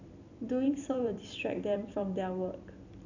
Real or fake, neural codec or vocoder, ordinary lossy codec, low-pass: fake; vocoder, 44.1 kHz, 128 mel bands every 256 samples, BigVGAN v2; none; 7.2 kHz